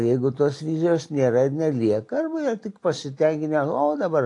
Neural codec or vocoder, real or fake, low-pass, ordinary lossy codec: none; real; 10.8 kHz; AAC, 48 kbps